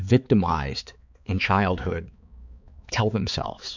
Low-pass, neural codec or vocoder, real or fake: 7.2 kHz; codec, 16 kHz, 4 kbps, X-Codec, HuBERT features, trained on balanced general audio; fake